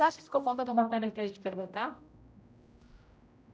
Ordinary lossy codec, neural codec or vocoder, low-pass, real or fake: none; codec, 16 kHz, 0.5 kbps, X-Codec, HuBERT features, trained on general audio; none; fake